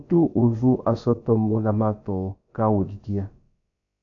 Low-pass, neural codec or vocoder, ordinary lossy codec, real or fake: 7.2 kHz; codec, 16 kHz, about 1 kbps, DyCAST, with the encoder's durations; AAC, 48 kbps; fake